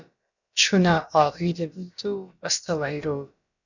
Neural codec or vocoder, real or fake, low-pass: codec, 16 kHz, about 1 kbps, DyCAST, with the encoder's durations; fake; 7.2 kHz